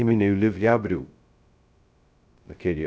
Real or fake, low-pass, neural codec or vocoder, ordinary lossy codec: fake; none; codec, 16 kHz, 0.2 kbps, FocalCodec; none